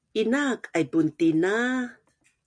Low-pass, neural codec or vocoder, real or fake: 9.9 kHz; none; real